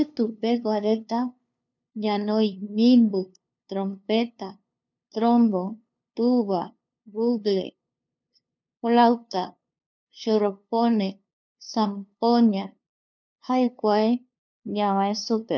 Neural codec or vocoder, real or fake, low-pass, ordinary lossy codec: codec, 16 kHz, 2 kbps, FunCodec, trained on LibriTTS, 25 frames a second; fake; 7.2 kHz; none